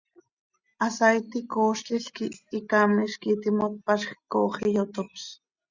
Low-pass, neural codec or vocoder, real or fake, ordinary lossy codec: 7.2 kHz; none; real; Opus, 64 kbps